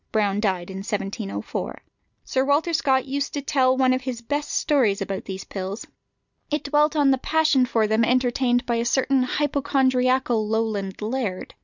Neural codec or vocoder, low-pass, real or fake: none; 7.2 kHz; real